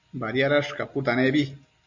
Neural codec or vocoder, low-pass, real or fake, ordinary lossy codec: none; 7.2 kHz; real; MP3, 48 kbps